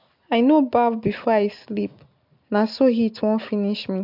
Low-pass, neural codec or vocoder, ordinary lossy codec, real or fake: 5.4 kHz; none; MP3, 48 kbps; real